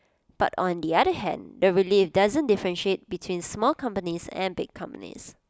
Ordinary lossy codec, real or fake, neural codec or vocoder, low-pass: none; real; none; none